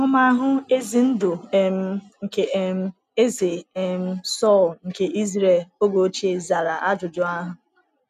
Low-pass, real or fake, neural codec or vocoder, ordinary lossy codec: 14.4 kHz; real; none; none